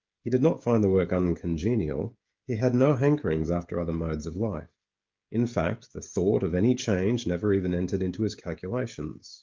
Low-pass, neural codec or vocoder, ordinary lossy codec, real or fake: 7.2 kHz; codec, 16 kHz, 16 kbps, FreqCodec, smaller model; Opus, 24 kbps; fake